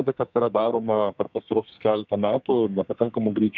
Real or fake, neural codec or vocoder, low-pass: fake; codec, 32 kHz, 1.9 kbps, SNAC; 7.2 kHz